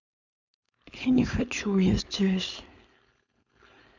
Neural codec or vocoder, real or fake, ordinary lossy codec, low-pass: codec, 16 kHz, 4.8 kbps, FACodec; fake; none; 7.2 kHz